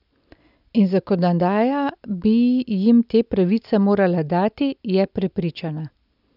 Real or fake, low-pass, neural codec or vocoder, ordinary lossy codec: real; 5.4 kHz; none; none